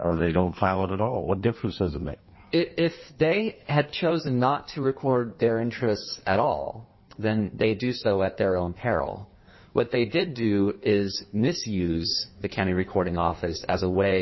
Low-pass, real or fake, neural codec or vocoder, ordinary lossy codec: 7.2 kHz; fake; codec, 16 kHz in and 24 kHz out, 1.1 kbps, FireRedTTS-2 codec; MP3, 24 kbps